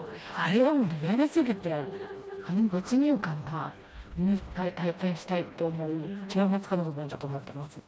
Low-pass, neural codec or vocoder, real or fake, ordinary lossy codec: none; codec, 16 kHz, 1 kbps, FreqCodec, smaller model; fake; none